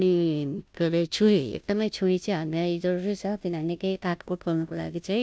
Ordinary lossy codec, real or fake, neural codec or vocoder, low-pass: none; fake; codec, 16 kHz, 0.5 kbps, FunCodec, trained on Chinese and English, 25 frames a second; none